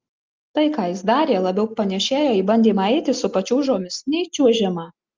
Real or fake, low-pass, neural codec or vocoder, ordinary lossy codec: real; 7.2 kHz; none; Opus, 24 kbps